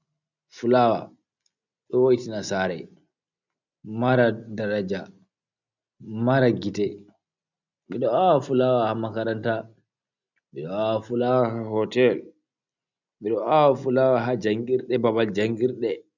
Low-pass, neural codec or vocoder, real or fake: 7.2 kHz; none; real